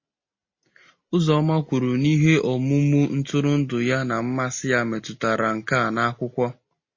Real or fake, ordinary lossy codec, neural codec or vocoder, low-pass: real; MP3, 32 kbps; none; 7.2 kHz